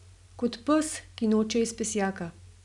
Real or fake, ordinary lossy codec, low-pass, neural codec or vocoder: real; none; 10.8 kHz; none